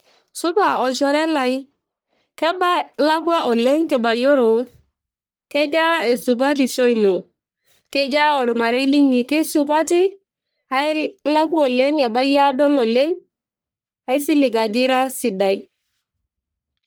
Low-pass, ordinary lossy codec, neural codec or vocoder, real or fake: none; none; codec, 44.1 kHz, 1.7 kbps, Pupu-Codec; fake